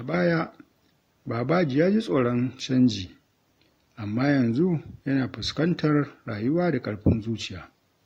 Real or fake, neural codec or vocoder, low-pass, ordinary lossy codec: real; none; 19.8 kHz; AAC, 48 kbps